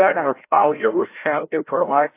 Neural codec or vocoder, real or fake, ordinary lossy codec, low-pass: codec, 16 kHz, 0.5 kbps, FreqCodec, larger model; fake; AAC, 24 kbps; 3.6 kHz